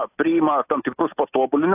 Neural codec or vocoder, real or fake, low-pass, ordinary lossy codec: none; real; 3.6 kHz; AAC, 32 kbps